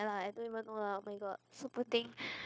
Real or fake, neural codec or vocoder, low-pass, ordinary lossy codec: fake; codec, 16 kHz, 0.9 kbps, LongCat-Audio-Codec; none; none